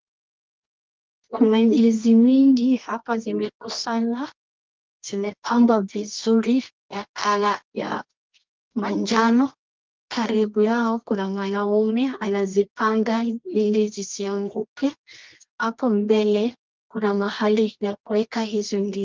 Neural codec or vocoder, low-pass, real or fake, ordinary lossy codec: codec, 24 kHz, 0.9 kbps, WavTokenizer, medium music audio release; 7.2 kHz; fake; Opus, 32 kbps